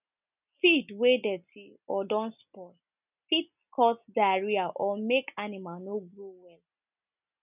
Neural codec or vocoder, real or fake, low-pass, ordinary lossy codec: none; real; 3.6 kHz; none